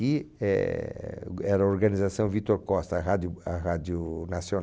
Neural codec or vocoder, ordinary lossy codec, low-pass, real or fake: none; none; none; real